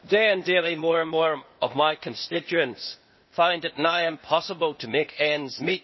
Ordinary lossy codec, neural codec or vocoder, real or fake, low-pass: MP3, 24 kbps; codec, 16 kHz, 0.8 kbps, ZipCodec; fake; 7.2 kHz